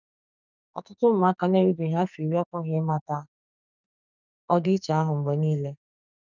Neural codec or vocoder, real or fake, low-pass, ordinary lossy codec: codec, 44.1 kHz, 2.6 kbps, SNAC; fake; 7.2 kHz; none